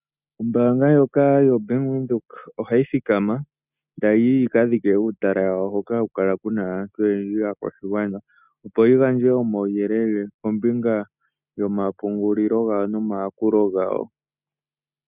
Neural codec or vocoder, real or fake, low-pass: none; real; 3.6 kHz